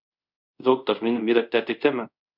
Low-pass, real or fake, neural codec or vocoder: 5.4 kHz; fake; codec, 24 kHz, 0.5 kbps, DualCodec